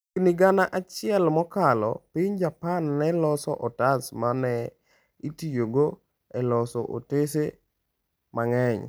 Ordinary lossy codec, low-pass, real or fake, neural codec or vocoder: none; none; real; none